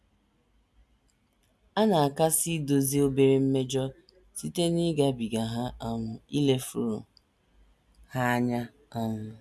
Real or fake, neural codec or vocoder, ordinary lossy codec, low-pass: real; none; none; none